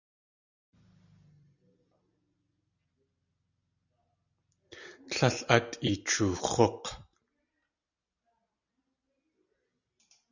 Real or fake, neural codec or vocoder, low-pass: real; none; 7.2 kHz